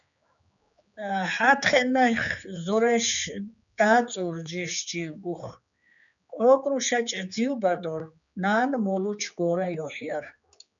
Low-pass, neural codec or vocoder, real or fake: 7.2 kHz; codec, 16 kHz, 4 kbps, X-Codec, HuBERT features, trained on general audio; fake